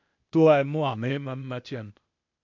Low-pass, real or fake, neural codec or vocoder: 7.2 kHz; fake; codec, 16 kHz, 0.8 kbps, ZipCodec